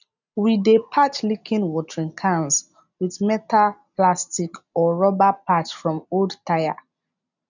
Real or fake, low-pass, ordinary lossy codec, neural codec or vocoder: real; 7.2 kHz; none; none